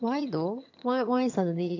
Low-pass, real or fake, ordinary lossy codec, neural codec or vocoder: 7.2 kHz; fake; AAC, 48 kbps; vocoder, 22.05 kHz, 80 mel bands, HiFi-GAN